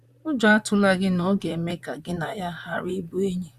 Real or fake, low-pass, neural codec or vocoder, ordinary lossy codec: fake; 14.4 kHz; vocoder, 44.1 kHz, 128 mel bands, Pupu-Vocoder; Opus, 64 kbps